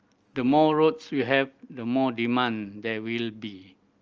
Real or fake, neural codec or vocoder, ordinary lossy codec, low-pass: real; none; Opus, 24 kbps; 7.2 kHz